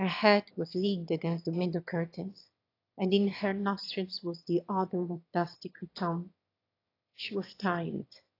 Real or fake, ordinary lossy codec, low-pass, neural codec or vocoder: fake; AAC, 32 kbps; 5.4 kHz; autoencoder, 22.05 kHz, a latent of 192 numbers a frame, VITS, trained on one speaker